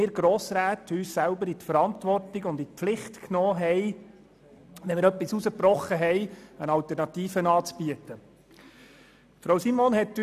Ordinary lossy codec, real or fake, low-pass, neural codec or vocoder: none; real; 14.4 kHz; none